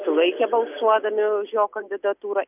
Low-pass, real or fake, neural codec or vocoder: 3.6 kHz; real; none